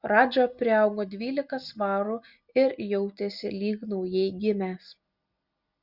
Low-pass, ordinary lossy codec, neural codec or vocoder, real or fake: 5.4 kHz; Opus, 64 kbps; none; real